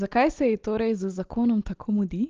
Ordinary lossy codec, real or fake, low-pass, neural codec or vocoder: Opus, 16 kbps; real; 7.2 kHz; none